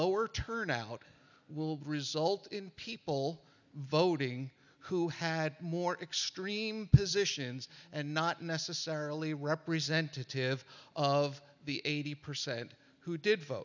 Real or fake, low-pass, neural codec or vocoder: real; 7.2 kHz; none